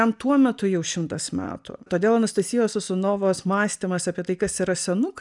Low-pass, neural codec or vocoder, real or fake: 10.8 kHz; none; real